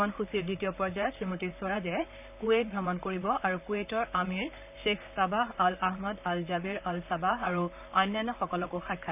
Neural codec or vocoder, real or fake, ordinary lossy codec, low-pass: vocoder, 44.1 kHz, 128 mel bands, Pupu-Vocoder; fake; none; 3.6 kHz